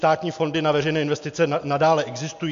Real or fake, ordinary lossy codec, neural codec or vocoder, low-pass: real; AAC, 64 kbps; none; 7.2 kHz